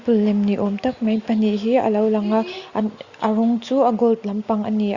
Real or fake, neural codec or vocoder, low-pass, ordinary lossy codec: real; none; 7.2 kHz; Opus, 64 kbps